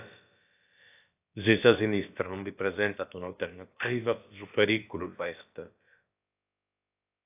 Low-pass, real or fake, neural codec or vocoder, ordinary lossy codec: 3.6 kHz; fake; codec, 16 kHz, about 1 kbps, DyCAST, with the encoder's durations; AAC, 32 kbps